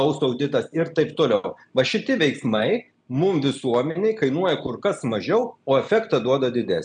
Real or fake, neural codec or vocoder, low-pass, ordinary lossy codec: real; none; 9.9 kHz; MP3, 96 kbps